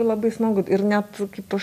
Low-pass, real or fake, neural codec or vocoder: 14.4 kHz; real; none